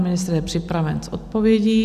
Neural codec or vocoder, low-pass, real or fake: none; 14.4 kHz; real